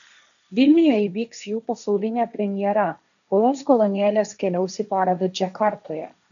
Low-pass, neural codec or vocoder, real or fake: 7.2 kHz; codec, 16 kHz, 1.1 kbps, Voila-Tokenizer; fake